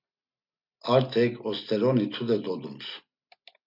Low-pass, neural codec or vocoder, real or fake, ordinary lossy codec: 5.4 kHz; none; real; MP3, 48 kbps